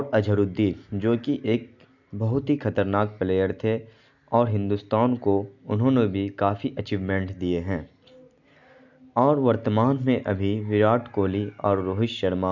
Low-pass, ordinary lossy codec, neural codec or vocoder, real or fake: 7.2 kHz; none; none; real